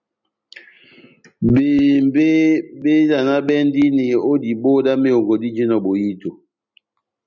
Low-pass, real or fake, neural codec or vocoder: 7.2 kHz; real; none